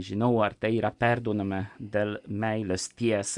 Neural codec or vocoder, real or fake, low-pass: none; real; 10.8 kHz